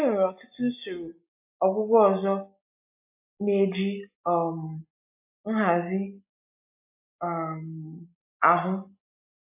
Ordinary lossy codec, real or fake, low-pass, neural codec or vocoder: AAC, 32 kbps; real; 3.6 kHz; none